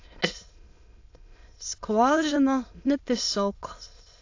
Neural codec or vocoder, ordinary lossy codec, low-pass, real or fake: autoencoder, 22.05 kHz, a latent of 192 numbers a frame, VITS, trained on many speakers; AAC, 48 kbps; 7.2 kHz; fake